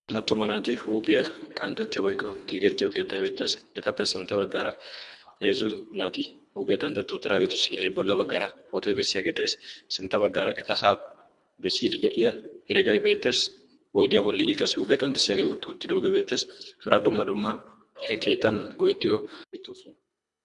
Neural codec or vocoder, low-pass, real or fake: codec, 24 kHz, 1.5 kbps, HILCodec; 10.8 kHz; fake